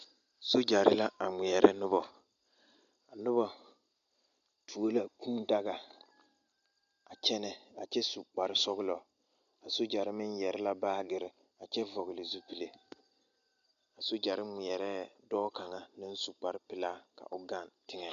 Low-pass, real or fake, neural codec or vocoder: 7.2 kHz; real; none